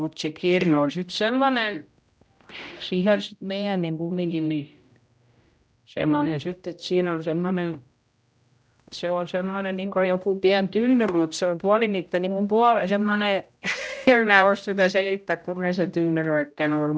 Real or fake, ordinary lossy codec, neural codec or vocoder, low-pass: fake; none; codec, 16 kHz, 0.5 kbps, X-Codec, HuBERT features, trained on general audio; none